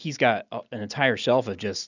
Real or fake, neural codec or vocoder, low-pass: real; none; 7.2 kHz